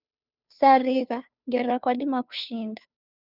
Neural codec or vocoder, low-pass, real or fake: codec, 16 kHz, 2 kbps, FunCodec, trained on Chinese and English, 25 frames a second; 5.4 kHz; fake